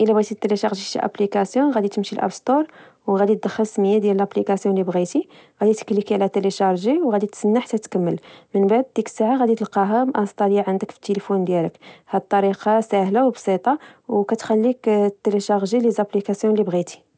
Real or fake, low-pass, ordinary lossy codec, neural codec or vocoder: real; none; none; none